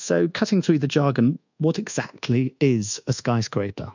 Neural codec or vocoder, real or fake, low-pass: codec, 24 kHz, 1.2 kbps, DualCodec; fake; 7.2 kHz